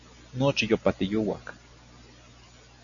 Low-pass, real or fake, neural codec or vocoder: 7.2 kHz; real; none